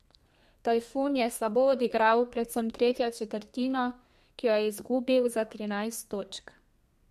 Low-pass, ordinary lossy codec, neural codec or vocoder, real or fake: 14.4 kHz; MP3, 64 kbps; codec, 32 kHz, 1.9 kbps, SNAC; fake